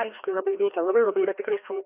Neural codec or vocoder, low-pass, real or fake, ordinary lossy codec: codec, 16 kHz, 1 kbps, FreqCodec, larger model; 3.6 kHz; fake; AAC, 32 kbps